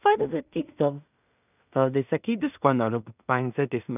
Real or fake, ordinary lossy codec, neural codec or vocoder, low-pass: fake; none; codec, 16 kHz in and 24 kHz out, 0.4 kbps, LongCat-Audio-Codec, two codebook decoder; 3.6 kHz